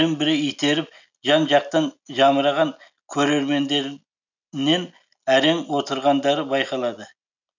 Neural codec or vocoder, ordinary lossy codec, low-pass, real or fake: none; none; 7.2 kHz; real